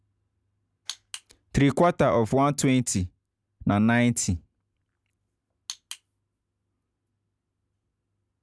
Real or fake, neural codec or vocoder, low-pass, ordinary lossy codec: real; none; none; none